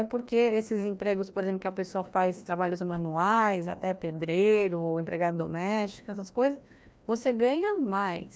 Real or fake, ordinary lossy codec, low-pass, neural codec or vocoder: fake; none; none; codec, 16 kHz, 1 kbps, FreqCodec, larger model